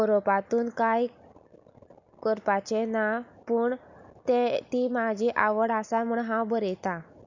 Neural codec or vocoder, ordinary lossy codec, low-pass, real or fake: none; none; 7.2 kHz; real